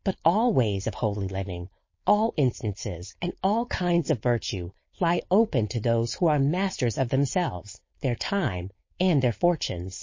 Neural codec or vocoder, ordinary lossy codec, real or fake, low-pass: codec, 16 kHz, 4.8 kbps, FACodec; MP3, 32 kbps; fake; 7.2 kHz